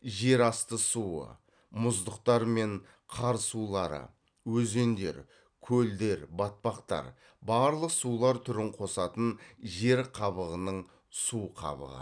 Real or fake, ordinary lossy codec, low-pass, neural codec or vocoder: real; none; 9.9 kHz; none